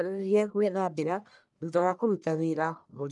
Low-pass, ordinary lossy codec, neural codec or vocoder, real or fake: 10.8 kHz; none; codec, 44.1 kHz, 1.7 kbps, Pupu-Codec; fake